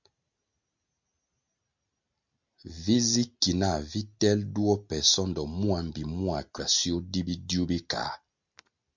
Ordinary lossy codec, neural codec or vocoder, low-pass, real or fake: MP3, 64 kbps; none; 7.2 kHz; real